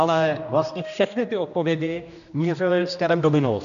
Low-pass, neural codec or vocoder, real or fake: 7.2 kHz; codec, 16 kHz, 1 kbps, X-Codec, HuBERT features, trained on general audio; fake